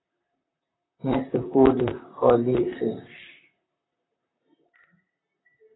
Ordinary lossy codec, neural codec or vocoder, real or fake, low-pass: AAC, 16 kbps; vocoder, 44.1 kHz, 128 mel bands, Pupu-Vocoder; fake; 7.2 kHz